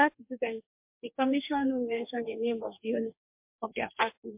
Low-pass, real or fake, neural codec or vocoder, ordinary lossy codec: 3.6 kHz; fake; codec, 44.1 kHz, 2.6 kbps, DAC; MP3, 32 kbps